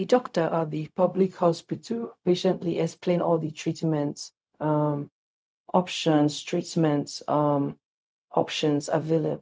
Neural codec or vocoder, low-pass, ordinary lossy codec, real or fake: codec, 16 kHz, 0.4 kbps, LongCat-Audio-Codec; none; none; fake